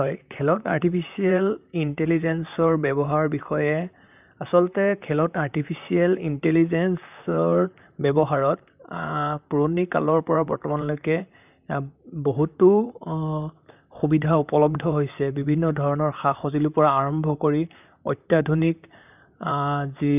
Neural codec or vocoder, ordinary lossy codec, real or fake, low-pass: vocoder, 44.1 kHz, 128 mel bands, Pupu-Vocoder; none; fake; 3.6 kHz